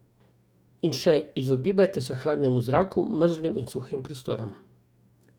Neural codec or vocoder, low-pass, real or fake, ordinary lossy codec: codec, 44.1 kHz, 2.6 kbps, DAC; 19.8 kHz; fake; none